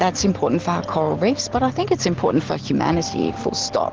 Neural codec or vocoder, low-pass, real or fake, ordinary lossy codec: none; 7.2 kHz; real; Opus, 16 kbps